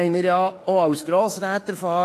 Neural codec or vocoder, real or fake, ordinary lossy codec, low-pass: autoencoder, 48 kHz, 32 numbers a frame, DAC-VAE, trained on Japanese speech; fake; AAC, 48 kbps; 14.4 kHz